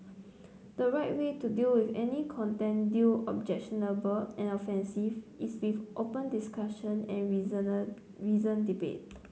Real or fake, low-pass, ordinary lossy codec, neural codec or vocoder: real; none; none; none